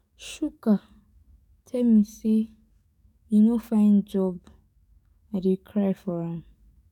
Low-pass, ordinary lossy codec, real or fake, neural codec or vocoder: 19.8 kHz; none; fake; codec, 44.1 kHz, 7.8 kbps, DAC